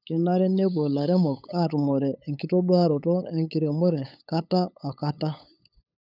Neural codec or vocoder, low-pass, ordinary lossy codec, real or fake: codec, 16 kHz, 8 kbps, FunCodec, trained on LibriTTS, 25 frames a second; 5.4 kHz; none; fake